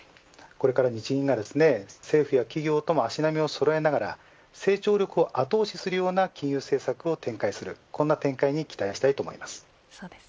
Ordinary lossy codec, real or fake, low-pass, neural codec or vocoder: none; real; none; none